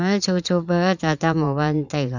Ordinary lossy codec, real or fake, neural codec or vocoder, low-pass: none; fake; vocoder, 44.1 kHz, 80 mel bands, Vocos; 7.2 kHz